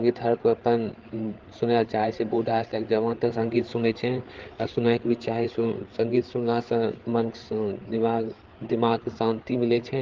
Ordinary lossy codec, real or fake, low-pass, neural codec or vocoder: Opus, 16 kbps; fake; 7.2 kHz; codec, 16 kHz, 8 kbps, FreqCodec, larger model